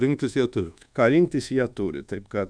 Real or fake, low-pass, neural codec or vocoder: fake; 9.9 kHz; codec, 24 kHz, 1.2 kbps, DualCodec